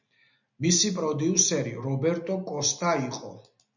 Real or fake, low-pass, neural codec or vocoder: real; 7.2 kHz; none